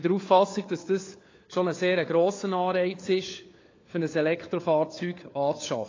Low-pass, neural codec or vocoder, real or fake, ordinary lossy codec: 7.2 kHz; codec, 16 kHz, 4 kbps, FunCodec, trained on LibriTTS, 50 frames a second; fake; AAC, 32 kbps